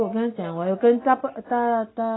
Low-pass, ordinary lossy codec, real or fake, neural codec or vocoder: 7.2 kHz; AAC, 16 kbps; real; none